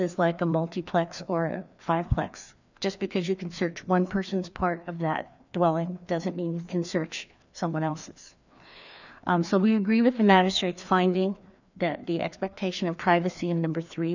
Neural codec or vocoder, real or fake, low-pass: codec, 16 kHz, 2 kbps, FreqCodec, larger model; fake; 7.2 kHz